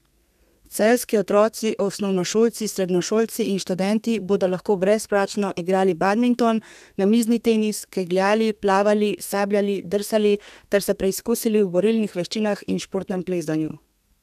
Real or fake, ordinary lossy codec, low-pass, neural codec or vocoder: fake; none; 14.4 kHz; codec, 32 kHz, 1.9 kbps, SNAC